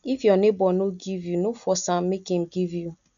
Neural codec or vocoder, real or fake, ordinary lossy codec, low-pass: none; real; none; 7.2 kHz